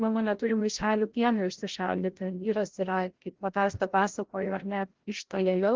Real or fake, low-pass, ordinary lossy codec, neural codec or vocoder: fake; 7.2 kHz; Opus, 16 kbps; codec, 16 kHz, 0.5 kbps, FreqCodec, larger model